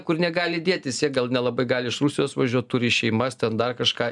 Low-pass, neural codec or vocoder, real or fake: 10.8 kHz; none; real